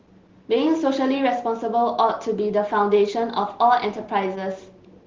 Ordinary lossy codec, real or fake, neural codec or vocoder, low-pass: Opus, 16 kbps; real; none; 7.2 kHz